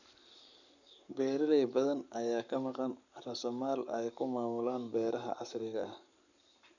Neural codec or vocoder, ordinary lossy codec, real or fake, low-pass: codec, 44.1 kHz, 7.8 kbps, Pupu-Codec; none; fake; 7.2 kHz